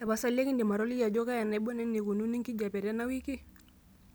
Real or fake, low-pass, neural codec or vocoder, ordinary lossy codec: real; none; none; none